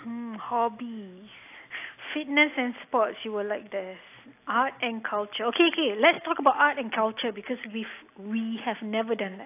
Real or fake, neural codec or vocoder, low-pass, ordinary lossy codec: real; none; 3.6 kHz; AAC, 24 kbps